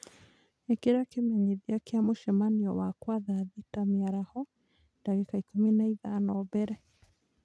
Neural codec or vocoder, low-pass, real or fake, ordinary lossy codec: none; none; real; none